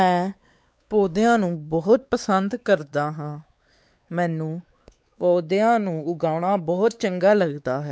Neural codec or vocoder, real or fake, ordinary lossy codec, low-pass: codec, 16 kHz, 4 kbps, X-Codec, WavLM features, trained on Multilingual LibriSpeech; fake; none; none